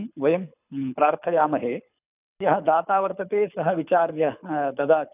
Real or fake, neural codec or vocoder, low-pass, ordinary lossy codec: fake; codec, 24 kHz, 6 kbps, HILCodec; 3.6 kHz; none